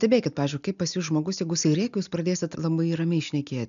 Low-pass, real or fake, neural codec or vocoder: 7.2 kHz; real; none